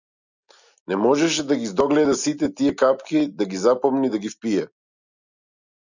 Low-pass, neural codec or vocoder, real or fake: 7.2 kHz; none; real